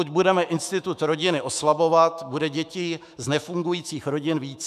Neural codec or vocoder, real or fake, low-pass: autoencoder, 48 kHz, 128 numbers a frame, DAC-VAE, trained on Japanese speech; fake; 14.4 kHz